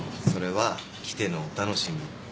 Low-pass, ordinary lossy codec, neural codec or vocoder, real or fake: none; none; none; real